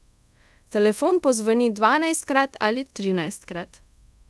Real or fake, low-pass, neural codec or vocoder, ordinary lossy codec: fake; none; codec, 24 kHz, 0.5 kbps, DualCodec; none